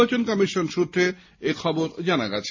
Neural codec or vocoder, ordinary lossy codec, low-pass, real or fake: none; MP3, 32 kbps; 7.2 kHz; real